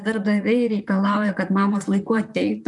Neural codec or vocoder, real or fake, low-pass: vocoder, 44.1 kHz, 128 mel bands, Pupu-Vocoder; fake; 10.8 kHz